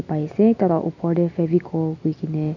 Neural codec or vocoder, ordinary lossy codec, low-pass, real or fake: none; none; 7.2 kHz; real